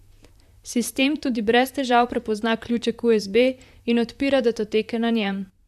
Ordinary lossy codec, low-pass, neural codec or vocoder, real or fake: AAC, 96 kbps; 14.4 kHz; vocoder, 44.1 kHz, 128 mel bands, Pupu-Vocoder; fake